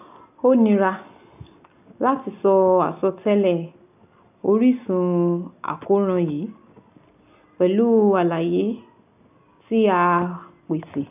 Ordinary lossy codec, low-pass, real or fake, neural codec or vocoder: AAC, 32 kbps; 3.6 kHz; real; none